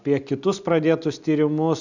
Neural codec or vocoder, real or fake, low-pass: none; real; 7.2 kHz